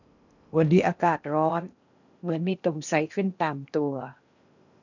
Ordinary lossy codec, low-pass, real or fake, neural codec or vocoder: none; 7.2 kHz; fake; codec, 16 kHz in and 24 kHz out, 0.8 kbps, FocalCodec, streaming, 65536 codes